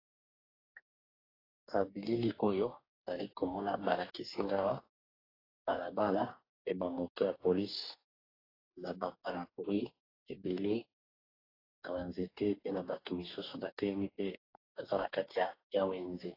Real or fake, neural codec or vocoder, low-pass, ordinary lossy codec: fake; codec, 44.1 kHz, 2.6 kbps, DAC; 5.4 kHz; AAC, 24 kbps